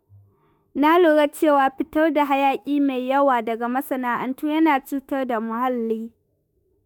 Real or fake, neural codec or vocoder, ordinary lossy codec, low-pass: fake; autoencoder, 48 kHz, 32 numbers a frame, DAC-VAE, trained on Japanese speech; none; none